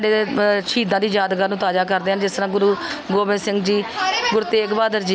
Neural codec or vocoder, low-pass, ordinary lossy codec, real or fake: none; none; none; real